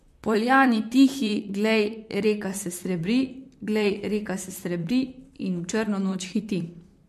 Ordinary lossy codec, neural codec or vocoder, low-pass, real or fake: MP3, 64 kbps; vocoder, 44.1 kHz, 128 mel bands, Pupu-Vocoder; 14.4 kHz; fake